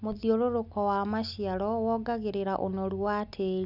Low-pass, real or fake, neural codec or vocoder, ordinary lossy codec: 5.4 kHz; real; none; none